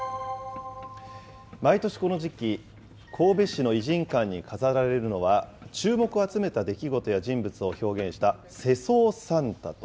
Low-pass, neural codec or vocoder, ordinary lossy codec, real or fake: none; none; none; real